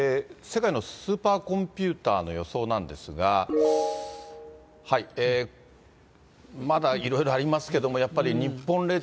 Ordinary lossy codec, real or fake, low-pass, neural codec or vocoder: none; real; none; none